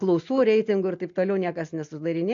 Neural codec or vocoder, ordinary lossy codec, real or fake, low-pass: none; AAC, 64 kbps; real; 7.2 kHz